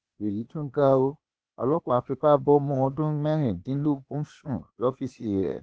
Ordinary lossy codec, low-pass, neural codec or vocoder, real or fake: none; none; codec, 16 kHz, 0.8 kbps, ZipCodec; fake